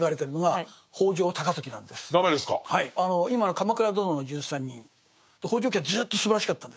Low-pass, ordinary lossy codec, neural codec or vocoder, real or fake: none; none; codec, 16 kHz, 6 kbps, DAC; fake